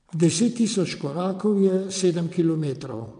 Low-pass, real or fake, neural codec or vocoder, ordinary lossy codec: 9.9 kHz; fake; vocoder, 22.05 kHz, 80 mel bands, WaveNeXt; none